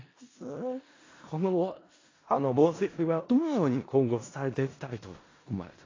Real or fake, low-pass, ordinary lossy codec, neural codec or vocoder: fake; 7.2 kHz; AAC, 32 kbps; codec, 16 kHz in and 24 kHz out, 0.4 kbps, LongCat-Audio-Codec, four codebook decoder